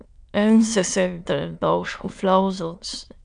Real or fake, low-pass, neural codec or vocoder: fake; 9.9 kHz; autoencoder, 22.05 kHz, a latent of 192 numbers a frame, VITS, trained on many speakers